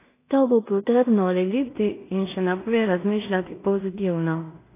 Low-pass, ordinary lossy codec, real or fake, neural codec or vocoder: 3.6 kHz; AAC, 24 kbps; fake; codec, 16 kHz in and 24 kHz out, 0.4 kbps, LongCat-Audio-Codec, two codebook decoder